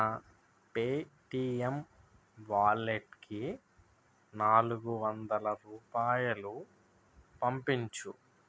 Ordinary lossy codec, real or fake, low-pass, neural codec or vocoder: none; real; none; none